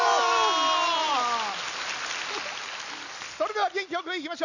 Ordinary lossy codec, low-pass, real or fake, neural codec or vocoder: none; 7.2 kHz; real; none